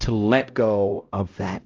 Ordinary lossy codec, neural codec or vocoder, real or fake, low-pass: Opus, 32 kbps; codec, 16 kHz, 0.5 kbps, X-Codec, HuBERT features, trained on LibriSpeech; fake; 7.2 kHz